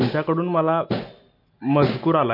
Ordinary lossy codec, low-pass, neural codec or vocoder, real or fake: MP3, 32 kbps; 5.4 kHz; none; real